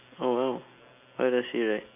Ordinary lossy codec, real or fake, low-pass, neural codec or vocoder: none; real; 3.6 kHz; none